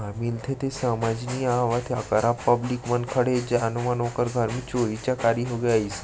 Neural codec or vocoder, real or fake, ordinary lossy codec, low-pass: none; real; none; none